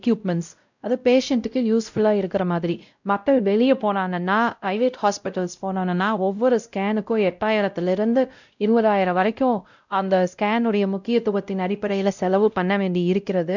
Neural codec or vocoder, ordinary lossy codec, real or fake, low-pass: codec, 16 kHz, 0.5 kbps, X-Codec, WavLM features, trained on Multilingual LibriSpeech; none; fake; 7.2 kHz